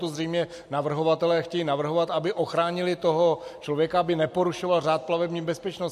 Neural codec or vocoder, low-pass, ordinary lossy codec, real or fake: none; 14.4 kHz; MP3, 64 kbps; real